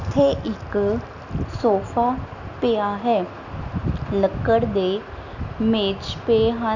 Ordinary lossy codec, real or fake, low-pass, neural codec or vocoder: none; real; 7.2 kHz; none